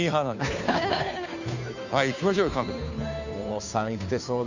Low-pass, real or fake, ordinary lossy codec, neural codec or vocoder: 7.2 kHz; fake; none; codec, 16 kHz, 2 kbps, FunCodec, trained on Chinese and English, 25 frames a second